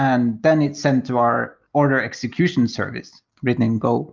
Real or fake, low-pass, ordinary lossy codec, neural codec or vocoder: real; 7.2 kHz; Opus, 24 kbps; none